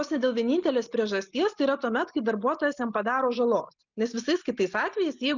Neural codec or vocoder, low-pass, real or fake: none; 7.2 kHz; real